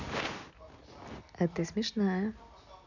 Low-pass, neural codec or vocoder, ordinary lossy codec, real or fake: 7.2 kHz; none; none; real